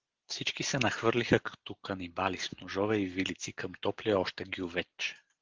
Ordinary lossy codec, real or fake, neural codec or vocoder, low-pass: Opus, 16 kbps; real; none; 7.2 kHz